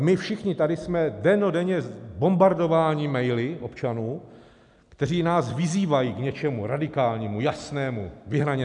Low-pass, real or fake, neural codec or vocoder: 10.8 kHz; real; none